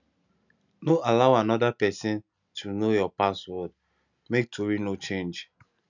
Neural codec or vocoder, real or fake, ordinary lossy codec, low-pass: none; real; none; 7.2 kHz